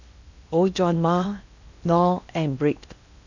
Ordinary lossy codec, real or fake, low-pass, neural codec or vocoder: none; fake; 7.2 kHz; codec, 16 kHz in and 24 kHz out, 0.8 kbps, FocalCodec, streaming, 65536 codes